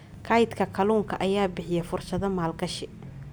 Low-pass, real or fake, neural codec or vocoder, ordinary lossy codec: none; real; none; none